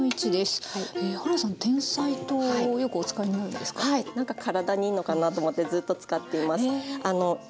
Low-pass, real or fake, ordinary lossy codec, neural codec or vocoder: none; real; none; none